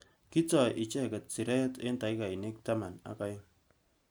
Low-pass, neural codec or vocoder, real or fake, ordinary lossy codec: none; none; real; none